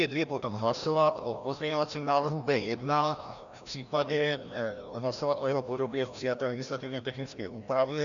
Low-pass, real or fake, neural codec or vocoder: 7.2 kHz; fake; codec, 16 kHz, 1 kbps, FreqCodec, larger model